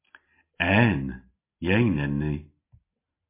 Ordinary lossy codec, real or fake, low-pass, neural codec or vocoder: MP3, 24 kbps; real; 3.6 kHz; none